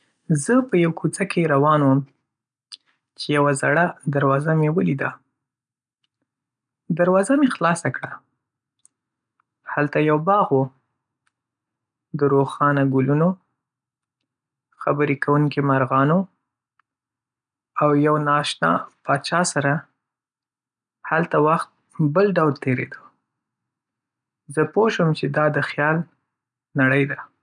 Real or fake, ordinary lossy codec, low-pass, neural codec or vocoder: real; none; 9.9 kHz; none